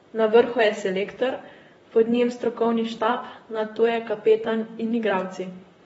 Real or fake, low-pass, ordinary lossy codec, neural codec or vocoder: fake; 19.8 kHz; AAC, 24 kbps; vocoder, 44.1 kHz, 128 mel bands, Pupu-Vocoder